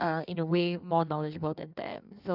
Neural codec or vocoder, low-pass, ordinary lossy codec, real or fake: codec, 16 kHz in and 24 kHz out, 1.1 kbps, FireRedTTS-2 codec; 5.4 kHz; none; fake